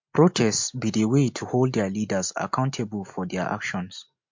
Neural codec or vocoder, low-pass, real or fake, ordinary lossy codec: none; 7.2 kHz; real; MP3, 48 kbps